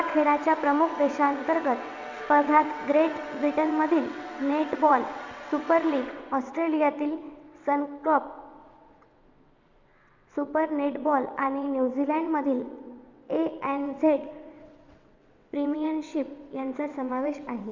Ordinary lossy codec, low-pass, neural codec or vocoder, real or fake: MP3, 64 kbps; 7.2 kHz; vocoder, 22.05 kHz, 80 mel bands, WaveNeXt; fake